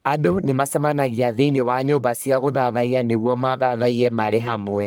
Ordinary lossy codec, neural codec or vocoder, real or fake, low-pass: none; codec, 44.1 kHz, 1.7 kbps, Pupu-Codec; fake; none